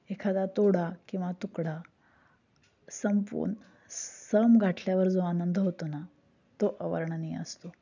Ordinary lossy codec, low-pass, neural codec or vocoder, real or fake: none; 7.2 kHz; none; real